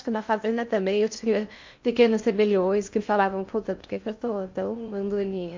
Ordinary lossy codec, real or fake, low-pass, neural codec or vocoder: MP3, 48 kbps; fake; 7.2 kHz; codec, 16 kHz in and 24 kHz out, 0.6 kbps, FocalCodec, streaming, 2048 codes